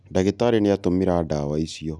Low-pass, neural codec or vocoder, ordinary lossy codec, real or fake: none; none; none; real